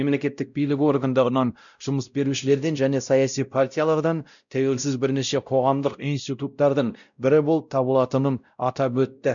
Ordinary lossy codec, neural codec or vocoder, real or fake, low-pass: none; codec, 16 kHz, 0.5 kbps, X-Codec, WavLM features, trained on Multilingual LibriSpeech; fake; 7.2 kHz